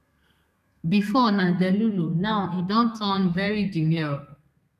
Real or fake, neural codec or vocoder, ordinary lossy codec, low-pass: fake; codec, 32 kHz, 1.9 kbps, SNAC; none; 14.4 kHz